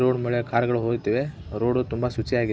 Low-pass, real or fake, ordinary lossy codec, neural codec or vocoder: none; real; none; none